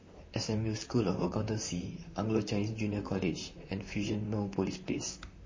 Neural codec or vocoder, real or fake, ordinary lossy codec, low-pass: vocoder, 44.1 kHz, 128 mel bands, Pupu-Vocoder; fake; MP3, 32 kbps; 7.2 kHz